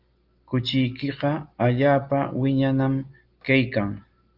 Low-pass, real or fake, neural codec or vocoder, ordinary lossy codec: 5.4 kHz; real; none; Opus, 32 kbps